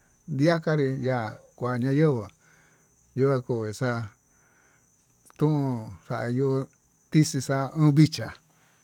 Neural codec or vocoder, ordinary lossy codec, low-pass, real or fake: codec, 44.1 kHz, 7.8 kbps, DAC; none; 19.8 kHz; fake